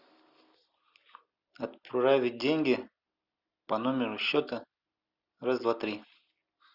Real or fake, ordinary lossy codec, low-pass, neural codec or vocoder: real; Opus, 64 kbps; 5.4 kHz; none